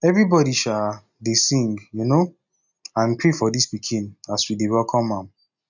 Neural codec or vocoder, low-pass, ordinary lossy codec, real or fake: none; 7.2 kHz; none; real